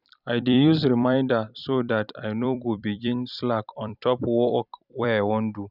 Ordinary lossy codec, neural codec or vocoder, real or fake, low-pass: none; vocoder, 44.1 kHz, 128 mel bands every 256 samples, BigVGAN v2; fake; 5.4 kHz